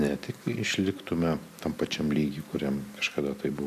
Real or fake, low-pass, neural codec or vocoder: real; 14.4 kHz; none